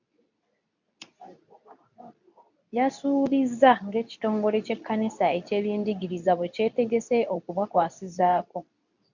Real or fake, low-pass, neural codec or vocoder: fake; 7.2 kHz; codec, 24 kHz, 0.9 kbps, WavTokenizer, medium speech release version 2